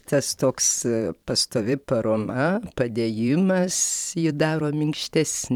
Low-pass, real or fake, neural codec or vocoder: 19.8 kHz; fake; vocoder, 44.1 kHz, 128 mel bands every 512 samples, BigVGAN v2